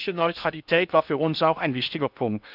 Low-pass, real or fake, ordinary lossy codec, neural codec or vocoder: 5.4 kHz; fake; none; codec, 16 kHz in and 24 kHz out, 0.6 kbps, FocalCodec, streaming, 2048 codes